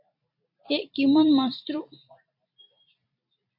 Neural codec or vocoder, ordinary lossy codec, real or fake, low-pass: vocoder, 44.1 kHz, 128 mel bands every 256 samples, BigVGAN v2; MP3, 32 kbps; fake; 5.4 kHz